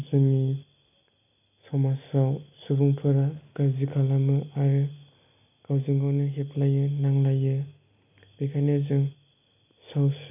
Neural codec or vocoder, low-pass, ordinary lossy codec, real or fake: none; 3.6 kHz; none; real